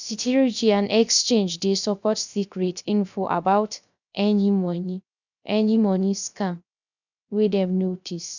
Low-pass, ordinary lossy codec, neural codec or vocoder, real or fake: 7.2 kHz; none; codec, 16 kHz, 0.3 kbps, FocalCodec; fake